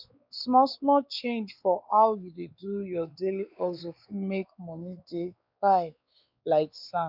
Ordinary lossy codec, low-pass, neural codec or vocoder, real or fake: none; 5.4 kHz; codec, 16 kHz, 4 kbps, X-Codec, WavLM features, trained on Multilingual LibriSpeech; fake